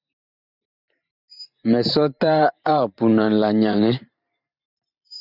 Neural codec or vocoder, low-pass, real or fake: vocoder, 44.1 kHz, 128 mel bands every 512 samples, BigVGAN v2; 5.4 kHz; fake